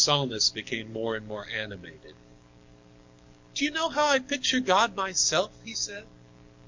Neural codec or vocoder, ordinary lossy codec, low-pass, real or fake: codec, 44.1 kHz, 7.8 kbps, DAC; MP3, 48 kbps; 7.2 kHz; fake